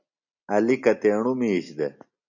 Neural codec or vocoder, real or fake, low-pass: none; real; 7.2 kHz